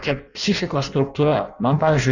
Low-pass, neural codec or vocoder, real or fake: 7.2 kHz; codec, 16 kHz in and 24 kHz out, 0.6 kbps, FireRedTTS-2 codec; fake